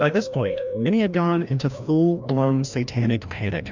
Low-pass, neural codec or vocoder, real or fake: 7.2 kHz; codec, 16 kHz, 1 kbps, FreqCodec, larger model; fake